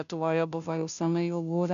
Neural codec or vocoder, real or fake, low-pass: codec, 16 kHz, 0.5 kbps, FunCodec, trained on Chinese and English, 25 frames a second; fake; 7.2 kHz